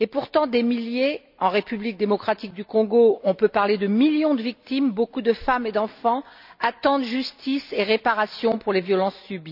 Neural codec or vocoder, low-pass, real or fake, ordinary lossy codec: none; 5.4 kHz; real; none